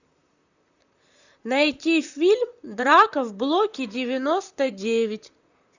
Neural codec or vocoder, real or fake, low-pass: vocoder, 44.1 kHz, 128 mel bands, Pupu-Vocoder; fake; 7.2 kHz